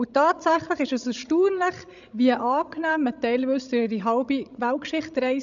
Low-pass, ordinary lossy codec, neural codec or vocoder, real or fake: 7.2 kHz; none; codec, 16 kHz, 16 kbps, FreqCodec, larger model; fake